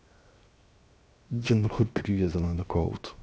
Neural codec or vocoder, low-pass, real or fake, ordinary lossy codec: codec, 16 kHz, 0.7 kbps, FocalCodec; none; fake; none